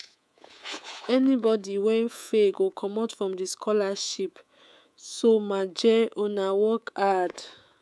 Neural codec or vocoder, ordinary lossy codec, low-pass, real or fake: codec, 24 kHz, 3.1 kbps, DualCodec; none; none; fake